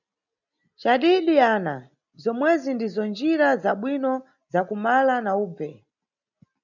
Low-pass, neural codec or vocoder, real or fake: 7.2 kHz; none; real